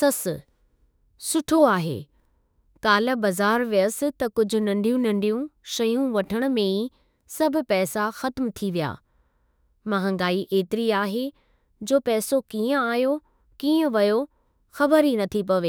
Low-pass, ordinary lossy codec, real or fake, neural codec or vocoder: none; none; fake; autoencoder, 48 kHz, 32 numbers a frame, DAC-VAE, trained on Japanese speech